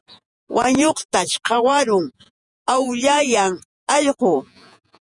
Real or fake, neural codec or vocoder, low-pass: fake; vocoder, 48 kHz, 128 mel bands, Vocos; 10.8 kHz